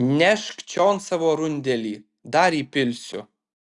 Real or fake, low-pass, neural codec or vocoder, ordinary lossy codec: real; 10.8 kHz; none; Opus, 64 kbps